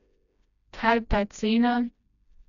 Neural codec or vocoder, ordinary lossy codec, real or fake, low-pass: codec, 16 kHz, 1 kbps, FreqCodec, smaller model; none; fake; 7.2 kHz